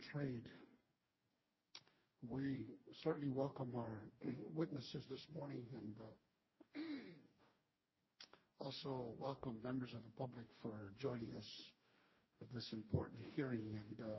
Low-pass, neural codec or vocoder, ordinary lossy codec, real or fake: 7.2 kHz; codec, 44.1 kHz, 2.6 kbps, DAC; MP3, 24 kbps; fake